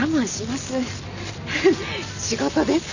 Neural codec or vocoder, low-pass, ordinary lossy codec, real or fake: none; 7.2 kHz; none; real